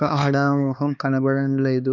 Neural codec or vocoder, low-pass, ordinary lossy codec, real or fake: codec, 16 kHz, 2 kbps, X-Codec, HuBERT features, trained on LibriSpeech; 7.2 kHz; none; fake